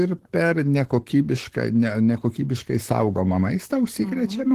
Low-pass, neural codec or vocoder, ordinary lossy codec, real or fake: 14.4 kHz; codec, 44.1 kHz, 7.8 kbps, Pupu-Codec; Opus, 16 kbps; fake